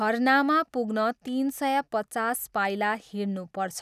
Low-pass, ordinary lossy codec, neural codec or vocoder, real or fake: 14.4 kHz; none; none; real